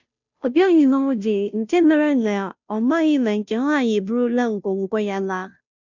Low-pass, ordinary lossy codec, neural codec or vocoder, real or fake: 7.2 kHz; none; codec, 16 kHz, 0.5 kbps, FunCodec, trained on Chinese and English, 25 frames a second; fake